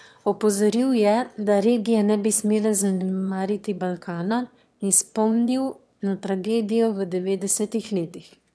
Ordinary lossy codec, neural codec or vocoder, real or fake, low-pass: none; autoencoder, 22.05 kHz, a latent of 192 numbers a frame, VITS, trained on one speaker; fake; none